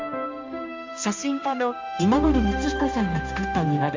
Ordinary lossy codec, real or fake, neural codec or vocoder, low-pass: MP3, 64 kbps; fake; codec, 16 kHz, 1 kbps, X-Codec, HuBERT features, trained on balanced general audio; 7.2 kHz